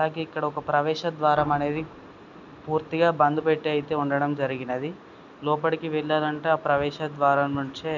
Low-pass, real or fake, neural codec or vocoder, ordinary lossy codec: 7.2 kHz; real; none; none